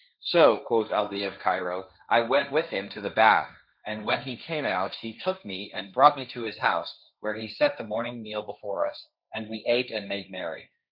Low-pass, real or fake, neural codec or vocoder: 5.4 kHz; fake; codec, 16 kHz, 1.1 kbps, Voila-Tokenizer